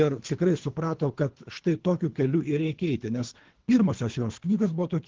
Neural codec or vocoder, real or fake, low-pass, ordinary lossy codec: codec, 24 kHz, 3 kbps, HILCodec; fake; 7.2 kHz; Opus, 16 kbps